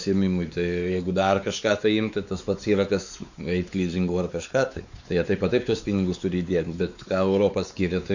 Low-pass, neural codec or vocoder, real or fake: 7.2 kHz; codec, 16 kHz, 4 kbps, X-Codec, WavLM features, trained on Multilingual LibriSpeech; fake